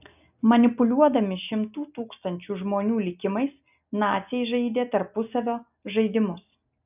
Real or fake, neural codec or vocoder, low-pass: real; none; 3.6 kHz